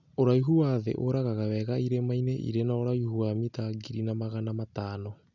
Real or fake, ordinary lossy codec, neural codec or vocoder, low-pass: real; none; none; 7.2 kHz